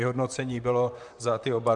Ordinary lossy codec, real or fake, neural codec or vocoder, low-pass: MP3, 96 kbps; fake; vocoder, 44.1 kHz, 128 mel bands, Pupu-Vocoder; 10.8 kHz